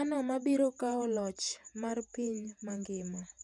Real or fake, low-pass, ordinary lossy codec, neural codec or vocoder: fake; 10.8 kHz; none; vocoder, 48 kHz, 128 mel bands, Vocos